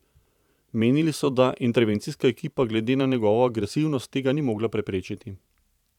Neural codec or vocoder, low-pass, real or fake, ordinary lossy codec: vocoder, 44.1 kHz, 128 mel bands every 512 samples, BigVGAN v2; 19.8 kHz; fake; none